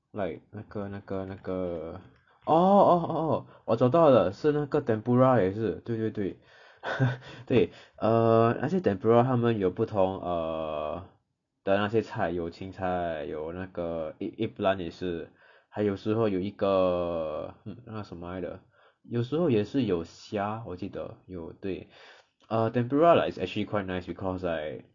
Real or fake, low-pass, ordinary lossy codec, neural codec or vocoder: real; 7.2 kHz; none; none